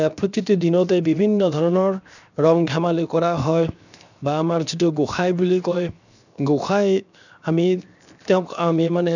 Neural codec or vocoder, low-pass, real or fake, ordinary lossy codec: codec, 16 kHz, 0.7 kbps, FocalCodec; 7.2 kHz; fake; none